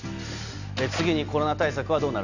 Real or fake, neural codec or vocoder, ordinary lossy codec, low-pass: real; none; none; 7.2 kHz